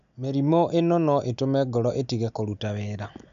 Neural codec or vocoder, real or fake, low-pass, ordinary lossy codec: none; real; 7.2 kHz; none